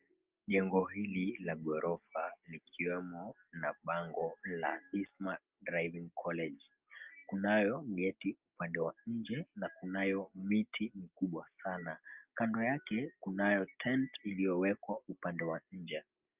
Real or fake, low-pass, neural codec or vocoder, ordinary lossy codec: real; 3.6 kHz; none; Opus, 24 kbps